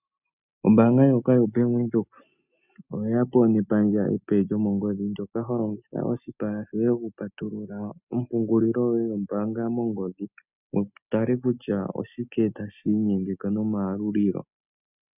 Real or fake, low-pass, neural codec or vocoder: real; 3.6 kHz; none